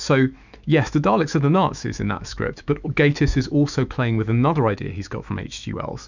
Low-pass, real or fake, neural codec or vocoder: 7.2 kHz; fake; autoencoder, 48 kHz, 128 numbers a frame, DAC-VAE, trained on Japanese speech